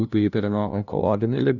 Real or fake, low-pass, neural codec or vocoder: fake; 7.2 kHz; codec, 16 kHz, 0.5 kbps, FunCodec, trained on LibriTTS, 25 frames a second